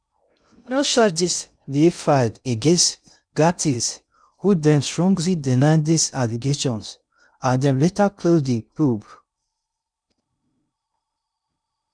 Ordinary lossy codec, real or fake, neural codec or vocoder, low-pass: none; fake; codec, 16 kHz in and 24 kHz out, 0.6 kbps, FocalCodec, streaming, 2048 codes; 9.9 kHz